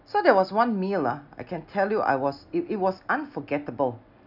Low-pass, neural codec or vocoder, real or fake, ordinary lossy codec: 5.4 kHz; none; real; none